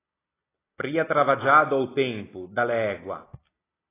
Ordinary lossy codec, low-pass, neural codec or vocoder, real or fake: AAC, 16 kbps; 3.6 kHz; none; real